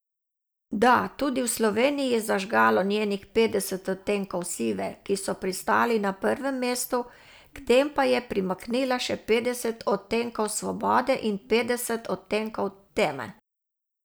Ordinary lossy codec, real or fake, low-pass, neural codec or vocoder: none; real; none; none